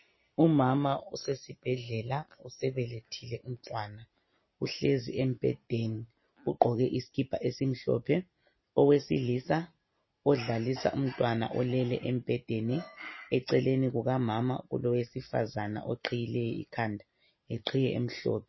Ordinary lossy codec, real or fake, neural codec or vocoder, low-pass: MP3, 24 kbps; real; none; 7.2 kHz